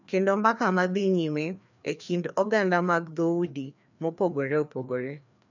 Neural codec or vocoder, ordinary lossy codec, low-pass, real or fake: codec, 16 kHz, 2 kbps, FreqCodec, larger model; none; 7.2 kHz; fake